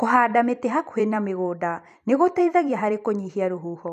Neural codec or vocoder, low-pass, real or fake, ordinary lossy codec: vocoder, 44.1 kHz, 128 mel bands every 256 samples, BigVGAN v2; 14.4 kHz; fake; none